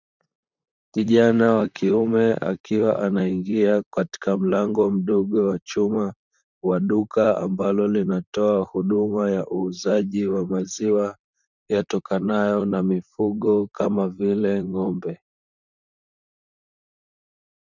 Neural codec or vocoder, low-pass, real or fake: vocoder, 44.1 kHz, 128 mel bands, Pupu-Vocoder; 7.2 kHz; fake